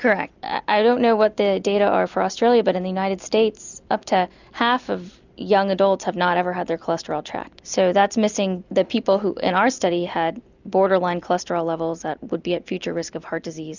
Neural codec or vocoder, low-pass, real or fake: none; 7.2 kHz; real